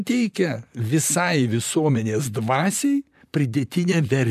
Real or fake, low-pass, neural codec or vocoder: fake; 14.4 kHz; vocoder, 44.1 kHz, 128 mel bands, Pupu-Vocoder